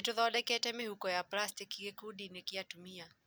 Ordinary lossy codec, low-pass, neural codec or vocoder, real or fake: none; none; none; real